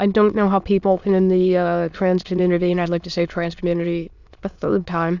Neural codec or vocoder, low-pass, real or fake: autoencoder, 22.05 kHz, a latent of 192 numbers a frame, VITS, trained on many speakers; 7.2 kHz; fake